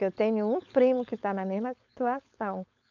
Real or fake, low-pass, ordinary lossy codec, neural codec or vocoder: fake; 7.2 kHz; none; codec, 16 kHz, 4.8 kbps, FACodec